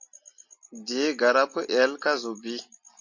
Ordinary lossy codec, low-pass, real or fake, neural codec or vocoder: MP3, 48 kbps; 7.2 kHz; real; none